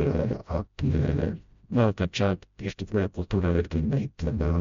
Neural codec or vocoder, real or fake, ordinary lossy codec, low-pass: codec, 16 kHz, 0.5 kbps, FreqCodec, smaller model; fake; MP3, 48 kbps; 7.2 kHz